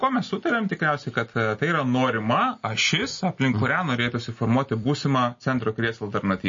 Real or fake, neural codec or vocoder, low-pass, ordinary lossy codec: real; none; 7.2 kHz; MP3, 32 kbps